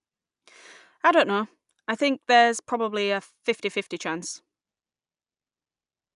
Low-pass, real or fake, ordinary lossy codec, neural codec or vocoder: 10.8 kHz; real; none; none